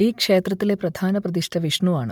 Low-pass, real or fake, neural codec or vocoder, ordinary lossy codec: 19.8 kHz; real; none; MP3, 96 kbps